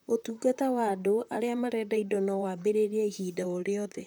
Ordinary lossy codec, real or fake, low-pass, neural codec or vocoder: none; fake; none; vocoder, 44.1 kHz, 128 mel bands, Pupu-Vocoder